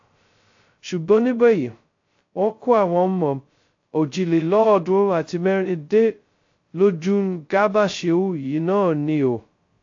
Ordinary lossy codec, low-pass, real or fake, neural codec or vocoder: AAC, 48 kbps; 7.2 kHz; fake; codec, 16 kHz, 0.2 kbps, FocalCodec